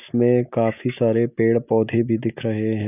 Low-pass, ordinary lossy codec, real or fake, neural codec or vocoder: 3.6 kHz; none; real; none